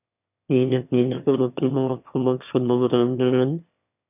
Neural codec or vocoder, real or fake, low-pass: autoencoder, 22.05 kHz, a latent of 192 numbers a frame, VITS, trained on one speaker; fake; 3.6 kHz